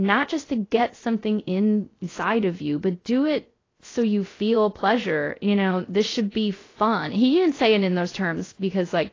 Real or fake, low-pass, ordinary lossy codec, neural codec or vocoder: fake; 7.2 kHz; AAC, 32 kbps; codec, 16 kHz, 0.3 kbps, FocalCodec